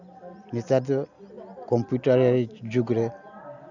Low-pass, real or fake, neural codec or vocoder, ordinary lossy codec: 7.2 kHz; real; none; none